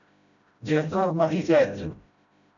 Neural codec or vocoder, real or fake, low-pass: codec, 16 kHz, 0.5 kbps, FreqCodec, smaller model; fake; 7.2 kHz